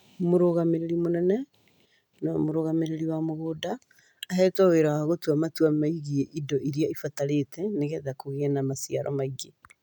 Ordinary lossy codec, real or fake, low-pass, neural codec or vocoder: none; real; 19.8 kHz; none